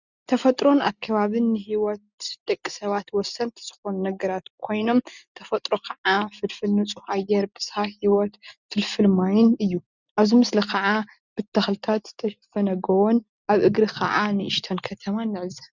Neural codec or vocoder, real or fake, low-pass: none; real; 7.2 kHz